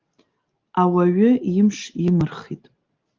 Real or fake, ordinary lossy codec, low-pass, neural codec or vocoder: real; Opus, 32 kbps; 7.2 kHz; none